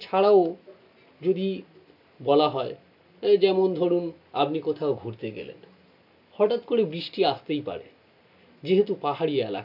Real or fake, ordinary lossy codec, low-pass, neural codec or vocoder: real; AAC, 48 kbps; 5.4 kHz; none